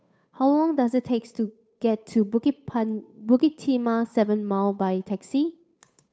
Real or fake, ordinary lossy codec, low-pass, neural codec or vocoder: fake; none; none; codec, 16 kHz, 8 kbps, FunCodec, trained on Chinese and English, 25 frames a second